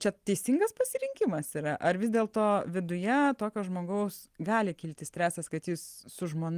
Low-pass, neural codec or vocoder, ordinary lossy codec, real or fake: 14.4 kHz; none; Opus, 24 kbps; real